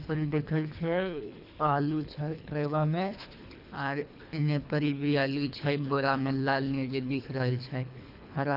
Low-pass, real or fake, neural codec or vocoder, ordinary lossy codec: 5.4 kHz; fake; codec, 24 kHz, 3 kbps, HILCodec; none